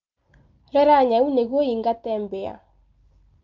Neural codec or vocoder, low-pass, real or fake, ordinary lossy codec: none; 7.2 kHz; real; Opus, 32 kbps